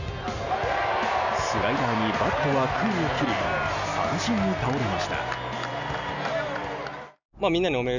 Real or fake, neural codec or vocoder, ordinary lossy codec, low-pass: real; none; none; 7.2 kHz